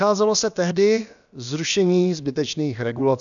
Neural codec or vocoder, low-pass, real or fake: codec, 16 kHz, about 1 kbps, DyCAST, with the encoder's durations; 7.2 kHz; fake